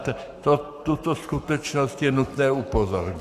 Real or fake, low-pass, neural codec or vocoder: fake; 14.4 kHz; codec, 44.1 kHz, 7.8 kbps, Pupu-Codec